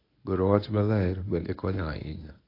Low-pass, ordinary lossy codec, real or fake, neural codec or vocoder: 5.4 kHz; AAC, 32 kbps; fake; codec, 24 kHz, 0.9 kbps, WavTokenizer, medium speech release version 1